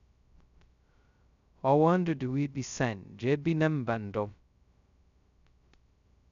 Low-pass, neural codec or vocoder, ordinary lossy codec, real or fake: 7.2 kHz; codec, 16 kHz, 0.2 kbps, FocalCodec; none; fake